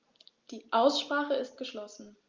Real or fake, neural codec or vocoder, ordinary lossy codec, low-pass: real; none; Opus, 32 kbps; 7.2 kHz